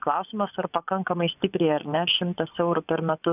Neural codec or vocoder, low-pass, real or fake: vocoder, 24 kHz, 100 mel bands, Vocos; 3.6 kHz; fake